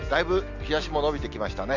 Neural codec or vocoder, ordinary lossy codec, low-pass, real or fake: none; none; 7.2 kHz; real